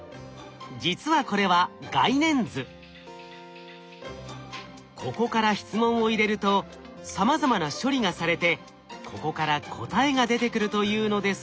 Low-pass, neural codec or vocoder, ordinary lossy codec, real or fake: none; none; none; real